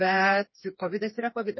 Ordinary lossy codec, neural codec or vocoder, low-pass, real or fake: MP3, 24 kbps; codec, 16 kHz, 4 kbps, FreqCodec, smaller model; 7.2 kHz; fake